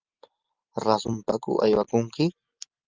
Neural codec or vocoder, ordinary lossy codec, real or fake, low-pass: none; Opus, 32 kbps; real; 7.2 kHz